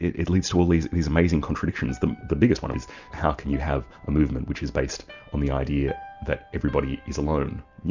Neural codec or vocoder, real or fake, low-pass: none; real; 7.2 kHz